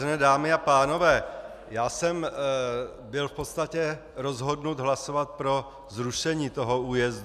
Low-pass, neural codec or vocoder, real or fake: 14.4 kHz; none; real